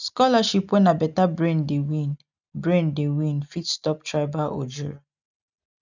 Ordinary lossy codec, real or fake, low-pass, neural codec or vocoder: none; real; 7.2 kHz; none